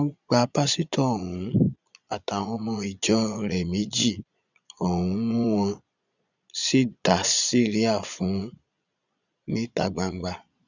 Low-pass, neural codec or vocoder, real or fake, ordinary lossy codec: 7.2 kHz; vocoder, 22.05 kHz, 80 mel bands, Vocos; fake; none